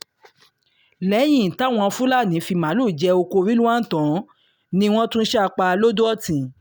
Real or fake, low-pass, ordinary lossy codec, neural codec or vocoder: real; none; none; none